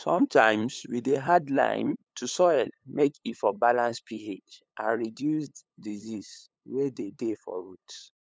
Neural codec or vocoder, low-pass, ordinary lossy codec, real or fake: codec, 16 kHz, 8 kbps, FunCodec, trained on LibriTTS, 25 frames a second; none; none; fake